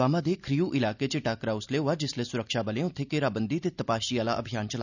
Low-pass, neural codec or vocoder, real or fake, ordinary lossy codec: 7.2 kHz; none; real; none